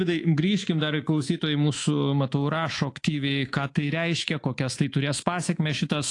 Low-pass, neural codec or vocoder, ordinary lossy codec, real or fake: 10.8 kHz; codec, 24 kHz, 3.1 kbps, DualCodec; AAC, 48 kbps; fake